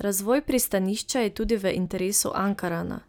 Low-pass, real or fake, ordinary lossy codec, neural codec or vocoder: none; real; none; none